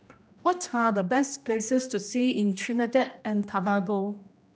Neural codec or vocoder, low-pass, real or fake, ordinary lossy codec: codec, 16 kHz, 1 kbps, X-Codec, HuBERT features, trained on general audio; none; fake; none